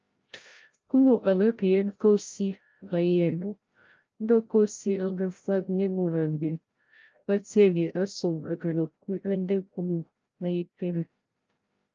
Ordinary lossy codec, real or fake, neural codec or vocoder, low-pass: Opus, 24 kbps; fake; codec, 16 kHz, 0.5 kbps, FreqCodec, larger model; 7.2 kHz